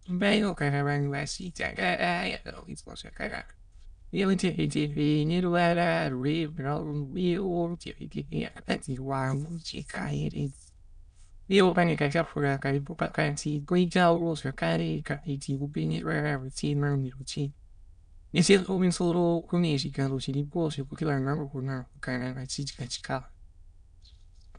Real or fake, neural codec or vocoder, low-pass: fake; autoencoder, 22.05 kHz, a latent of 192 numbers a frame, VITS, trained on many speakers; 9.9 kHz